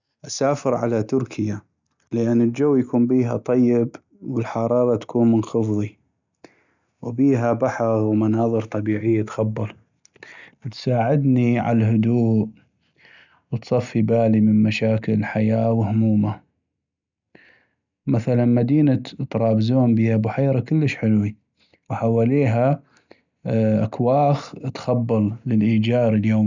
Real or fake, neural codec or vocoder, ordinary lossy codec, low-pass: real; none; none; 7.2 kHz